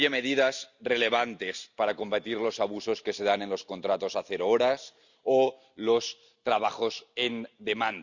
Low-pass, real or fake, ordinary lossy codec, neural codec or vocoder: 7.2 kHz; real; Opus, 64 kbps; none